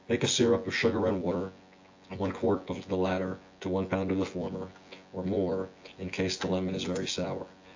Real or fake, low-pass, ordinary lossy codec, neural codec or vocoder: fake; 7.2 kHz; AAC, 48 kbps; vocoder, 24 kHz, 100 mel bands, Vocos